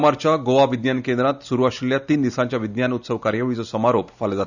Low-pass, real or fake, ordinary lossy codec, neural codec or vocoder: 7.2 kHz; real; none; none